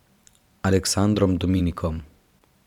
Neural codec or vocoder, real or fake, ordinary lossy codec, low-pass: vocoder, 44.1 kHz, 128 mel bands every 512 samples, BigVGAN v2; fake; none; 19.8 kHz